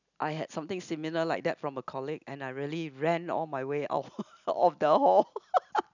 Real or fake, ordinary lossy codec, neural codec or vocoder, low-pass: real; none; none; 7.2 kHz